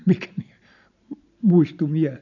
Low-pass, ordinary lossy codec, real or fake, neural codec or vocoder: 7.2 kHz; none; real; none